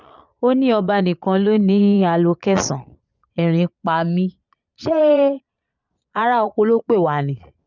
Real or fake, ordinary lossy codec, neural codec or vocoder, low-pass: fake; none; vocoder, 22.05 kHz, 80 mel bands, Vocos; 7.2 kHz